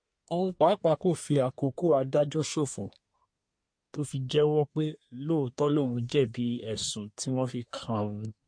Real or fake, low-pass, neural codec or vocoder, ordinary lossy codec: fake; 9.9 kHz; codec, 24 kHz, 1 kbps, SNAC; MP3, 48 kbps